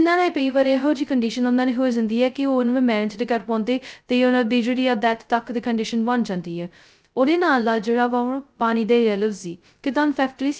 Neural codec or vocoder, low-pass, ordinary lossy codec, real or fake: codec, 16 kHz, 0.2 kbps, FocalCodec; none; none; fake